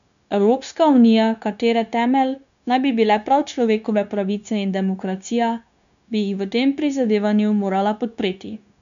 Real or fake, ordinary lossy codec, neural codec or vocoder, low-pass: fake; none; codec, 16 kHz, 0.9 kbps, LongCat-Audio-Codec; 7.2 kHz